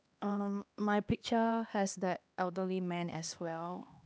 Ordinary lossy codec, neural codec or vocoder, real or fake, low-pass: none; codec, 16 kHz, 2 kbps, X-Codec, HuBERT features, trained on LibriSpeech; fake; none